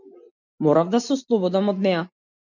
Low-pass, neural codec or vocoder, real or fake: 7.2 kHz; none; real